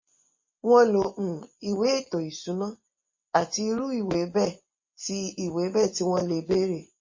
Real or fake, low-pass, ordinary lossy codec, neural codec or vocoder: fake; 7.2 kHz; MP3, 32 kbps; vocoder, 24 kHz, 100 mel bands, Vocos